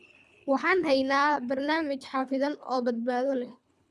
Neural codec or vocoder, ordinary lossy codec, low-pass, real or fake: codec, 24 kHz, 3 kbps, HILCodec; none; none; fake